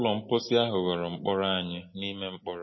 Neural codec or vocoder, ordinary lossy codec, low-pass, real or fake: none; MP3, 24 kbps; 7.2 kHz; real